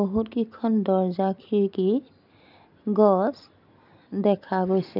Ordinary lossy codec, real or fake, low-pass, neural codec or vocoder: none; real; 5.4 kHz; none